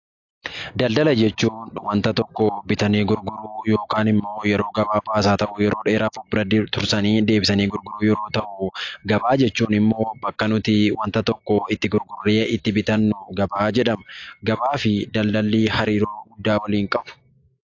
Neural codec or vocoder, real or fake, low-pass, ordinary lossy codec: none; real; 7.2 kHz; AAC, 48 kbps